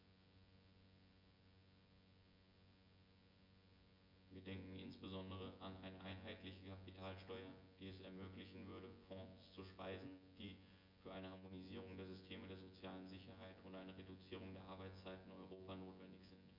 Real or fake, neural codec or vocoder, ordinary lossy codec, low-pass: fake; vocoder, 24 kHz, 100 mel bands, Vocos; MP3, 48 kbps; 5.4 kHz